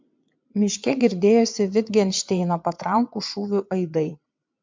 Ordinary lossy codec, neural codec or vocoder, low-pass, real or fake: MP3, 64 kbps; vocoder, 22.05 kHz, 80 mel bands, WaveNeXt; 7.2 kHz; fake